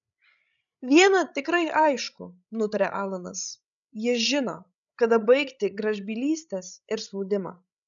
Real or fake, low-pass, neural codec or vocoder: fake; 7.2 kHz; codec, 16 kHz, 16 kbps, FreqCodec, larger model